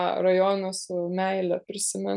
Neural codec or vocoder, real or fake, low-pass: none; real; 10.8 kHz